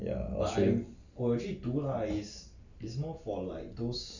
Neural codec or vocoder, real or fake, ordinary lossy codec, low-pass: none; real; Opus, 64 kbps; 7.2 kHz